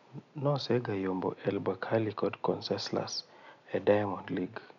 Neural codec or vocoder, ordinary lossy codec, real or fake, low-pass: none; none; real; 7.2 kHz